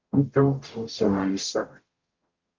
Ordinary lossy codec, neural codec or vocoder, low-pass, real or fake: Opus, 24 kbps; codec, 44.1 kHz, 0.9 kbps, DAC; 7.2 kHz; fake